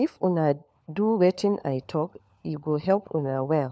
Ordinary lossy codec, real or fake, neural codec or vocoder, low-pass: none; fake; codec, 16 kHz, 4 kbps, FunCodec, trained on LibriTTS, 50 frames a second; none